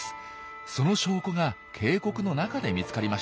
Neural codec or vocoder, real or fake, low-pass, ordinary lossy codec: none; real; none; none